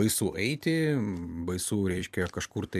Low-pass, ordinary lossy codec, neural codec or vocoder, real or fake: 14.4 kHz; MP3, 96 kbps; vocoder, 44.1 kHz, 128 mel bands every 256 samples, BigVGAN v2; fake